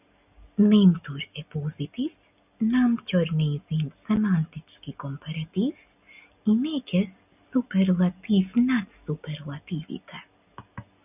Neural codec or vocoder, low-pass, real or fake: none; 3.6 kHz; real